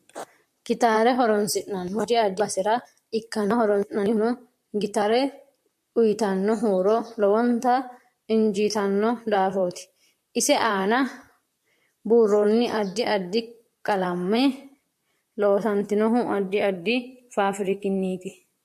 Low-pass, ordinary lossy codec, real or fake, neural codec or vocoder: 14.4 kHz; MP3, 64 kbps; fake; vocoder, 44.1 kHz, 128 mel bands, Pupu-Vocoder